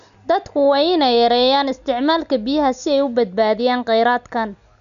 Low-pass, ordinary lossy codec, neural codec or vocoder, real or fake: 7.2 kHz; none; none; real